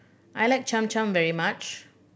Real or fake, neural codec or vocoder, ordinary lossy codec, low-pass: real; none; none; none